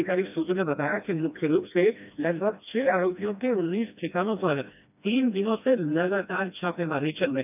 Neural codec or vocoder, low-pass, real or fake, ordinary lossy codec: codec, 16 kHz, 1 kbps, FreqCodec, smaller model; 3.6 kHz; fake; none